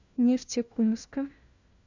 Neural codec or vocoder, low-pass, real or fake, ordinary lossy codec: codec, 16 kHz, 1 kbps, FunCodec, trained on LibriTTS, 50 frames a second; 7.2 kHz; fake; Opus, 64 kbps